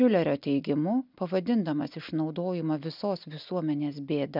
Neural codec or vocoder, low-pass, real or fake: none; 5.4 kHz; real